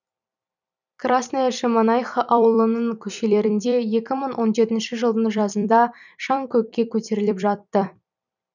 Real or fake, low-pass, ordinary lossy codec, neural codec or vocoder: fake; 7.2 kHz; none; vocoder, 44.1 kHz, 128 mel bands every 256 samples, BigVGAN v2